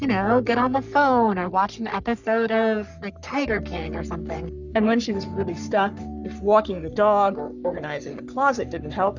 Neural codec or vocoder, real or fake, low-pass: codec, 44.1 kHz, 3.4 kbps, Pupu-Codec; fake; 7.2 kHz